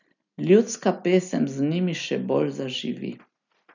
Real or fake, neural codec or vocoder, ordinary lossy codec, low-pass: real; none; none; 7.2 kHz